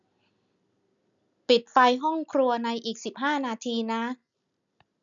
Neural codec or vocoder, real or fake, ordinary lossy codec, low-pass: none; real; none; 7.2 kHz